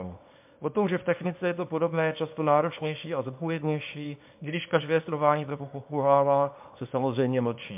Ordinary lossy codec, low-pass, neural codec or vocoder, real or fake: MP3, 32 kbps; 3.6 kHz; codec, 24 kHz, 0.9 kbps, WavTokenizer, small release; fake